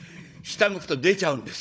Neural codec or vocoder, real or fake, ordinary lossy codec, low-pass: codec, 16 kHz, 16 kbps, FunCodec, trained on Chinese and English, 50 frames a second; fake; none; none